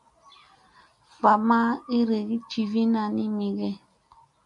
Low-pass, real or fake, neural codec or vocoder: 10.8 kHz; real; none